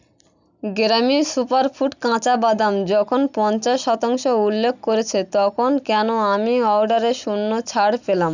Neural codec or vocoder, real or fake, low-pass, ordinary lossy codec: none; real; 7.2 kHz; none